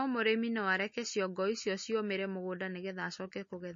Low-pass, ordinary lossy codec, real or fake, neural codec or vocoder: 7.2 kHz; MP3, 32 kbps; real; none